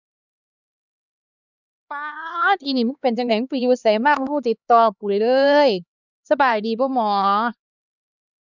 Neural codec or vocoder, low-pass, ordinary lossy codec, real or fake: codec, 16 kHz, 2 kbps, X-Codec, HuBERT features, trained on LibriSpeech; 7.2 kHz; none; fake